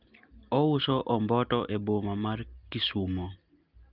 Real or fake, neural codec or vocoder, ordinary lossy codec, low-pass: real; none; Opus, 32 kbps; 5.4 kHz